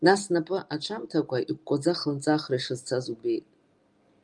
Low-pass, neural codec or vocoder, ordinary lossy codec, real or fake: 10.8 kHz; none; Opus, 32 kbps; real